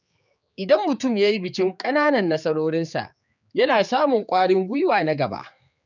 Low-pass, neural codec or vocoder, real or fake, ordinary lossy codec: 7.2 kHz; codec, 16 kHz, 4 kbps, X-Codec, HuBERT features, trained on general audio; fake; none